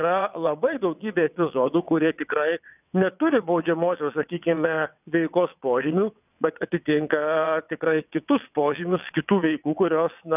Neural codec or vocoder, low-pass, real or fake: vocoder, 22.05 kHz, 80 mel bands, WaveNeXt; 3.6 kHz; fake